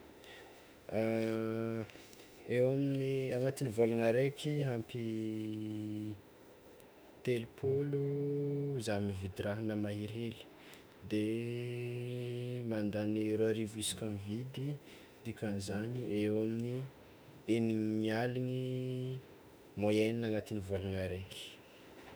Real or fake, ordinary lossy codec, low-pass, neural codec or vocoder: fake; none; none; autoencoder, 48 kHz, 32 numbers a frame, DAC-VAE, trained on Japanese speech